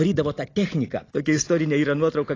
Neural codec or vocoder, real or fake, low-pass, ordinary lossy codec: none; real; 7.2 kHz; AAC, 32 kbps